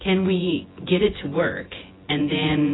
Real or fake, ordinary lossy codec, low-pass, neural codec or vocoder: fake; AAC, 16 kbps; 7.2 kHz; vocoder, 24 kHz, 100 mel bands, Vocos